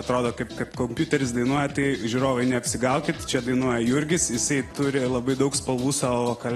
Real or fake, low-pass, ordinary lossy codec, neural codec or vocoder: real; 19.8 kHz; AAC, 32 kbps; none